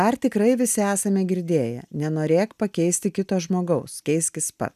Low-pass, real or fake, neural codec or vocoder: 14.4 kHz; real; none